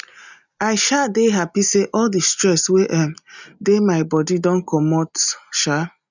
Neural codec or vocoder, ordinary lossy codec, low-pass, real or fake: none; none; 7.2 kHz; real